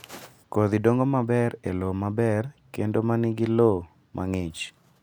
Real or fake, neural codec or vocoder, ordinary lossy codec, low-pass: real; none; none; none